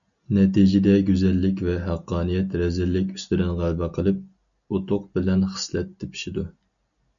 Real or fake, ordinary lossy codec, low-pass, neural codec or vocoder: real; MP3, 96 kbps; 7.2 kHz; none